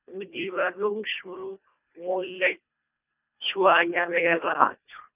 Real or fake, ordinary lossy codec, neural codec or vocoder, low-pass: fake; none; codec, 24 kHz, 1.5 kbps, HILCodec; 3.6 kHz